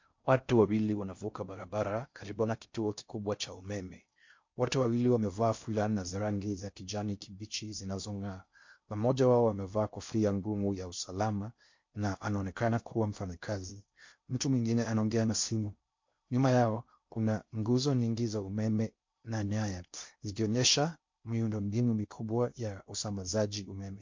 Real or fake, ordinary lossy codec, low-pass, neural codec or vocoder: fake; MP3, 48 kbps; 7.2 kHz; codec, 16 kHz in and 24 kHz out, 0.6 kbps, FocalCodec, streaming, 4096 codes